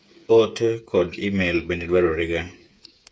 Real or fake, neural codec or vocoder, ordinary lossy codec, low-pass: fake; codec, 16 kHz, 8 kbps, FreqCodec, smaller model; none; none